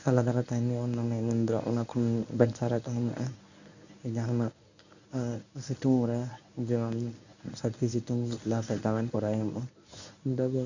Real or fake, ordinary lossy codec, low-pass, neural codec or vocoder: fake; none; 7.2 kHz; codec, 24 kHz, 0.9 kbps, WavTokenizer, medium speech release version 1